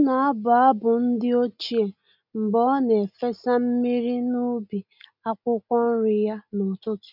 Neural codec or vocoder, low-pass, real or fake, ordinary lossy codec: none; 5.4 kHz; real; none